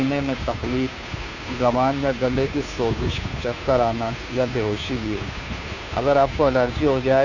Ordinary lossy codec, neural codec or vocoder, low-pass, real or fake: none; codec, 16 kHz, 2 kbps, FunCodec, trained on Chinese and English, 25 frames a second; 7.2 kHz; fake